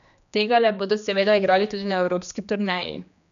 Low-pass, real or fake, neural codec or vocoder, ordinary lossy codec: 7.2 kHz; fake; codec, 16 kHz, 2 kbps, X-Codec, HuBERT features, trained on general audio; none